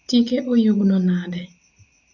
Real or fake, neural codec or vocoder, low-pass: real; none; 7.2 kHz